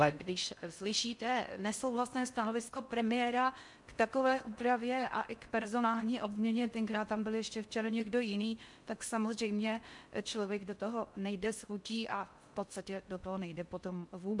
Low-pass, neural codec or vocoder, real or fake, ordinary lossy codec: 10.8 kHz; codec, 16 kHz in and 24 kHz out, 0.6 kbps, FocalCodec, streaming, 4096 codes; fake; MP3, 64 kbps